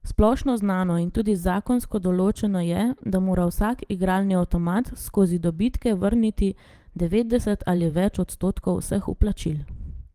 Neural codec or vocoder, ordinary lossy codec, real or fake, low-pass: vocoder, 44.1 kHz, 128 mel bands every 512 samples, BigVGAN v2; Opus, 24 kbps; fake; 14.4 kHz